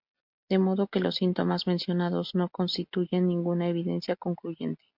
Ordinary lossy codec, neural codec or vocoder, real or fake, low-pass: MP3, 48 kbps; none; real; 5.4 kHz